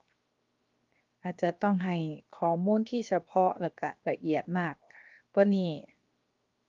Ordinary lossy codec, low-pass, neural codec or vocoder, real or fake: Opus, 32 kbps; 7.2 kHz; codec, 16 kHz, 0.7 kbps, FocalCodec; fake